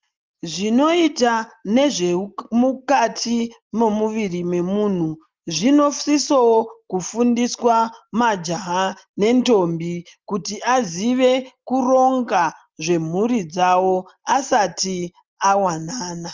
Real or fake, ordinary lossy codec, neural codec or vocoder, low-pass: real; Opus, 32 kbps; none; 7.2 kHz